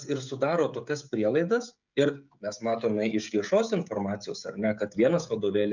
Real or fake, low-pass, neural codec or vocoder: fake; 7.2 kHz; codec, 16 kHz, 16 kbps, FreqCodec, smaller model